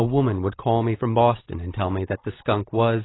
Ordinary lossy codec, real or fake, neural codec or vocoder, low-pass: AAC, 16 kbps; real; none; 7.2 kHz